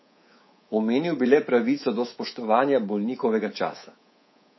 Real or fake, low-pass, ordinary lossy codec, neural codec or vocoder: fake; 7.2 kHz; MP3, 24 kbps; codec, 24 kHz, 3.1 kbps, DualCodec